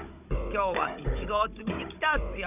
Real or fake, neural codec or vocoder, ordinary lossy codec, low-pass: fake; codec, 16 kHz, 16 kbps, FunCodec, trained on Chinese and English, 50 frames a second; none; 3.6 kHz